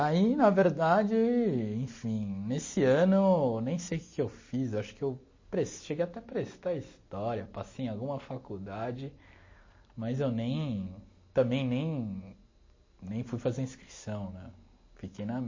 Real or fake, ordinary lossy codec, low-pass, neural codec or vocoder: real; MP3, 32 kbps; 7.2 kHz; none